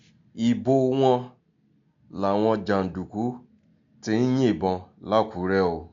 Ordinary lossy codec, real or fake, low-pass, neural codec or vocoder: MP3, 64 kbps; real; 7.2 kHz; none